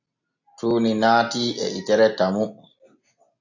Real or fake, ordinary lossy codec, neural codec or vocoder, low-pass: real; AAC, 48 kbps; none; 7.2 kHz